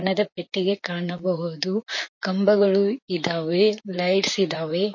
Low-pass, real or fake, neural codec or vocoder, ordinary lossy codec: 7.2 kHz; fake; vocoder, 44.1 kHz, 128 mel bands, Pupu-Vocoder; MP3, 32 kbps